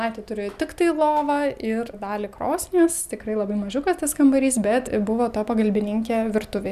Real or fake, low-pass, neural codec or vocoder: real; 14.4 kHz; none